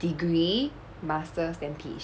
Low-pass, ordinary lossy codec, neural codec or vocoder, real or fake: none; none; none; real